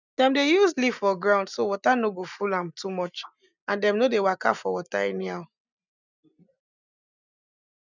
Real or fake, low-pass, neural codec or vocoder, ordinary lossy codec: real; 7.2 kHz; none; none